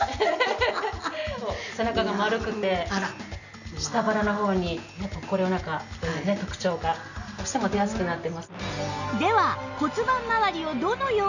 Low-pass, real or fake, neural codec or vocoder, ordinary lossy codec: 7.2 kHz; real; none; none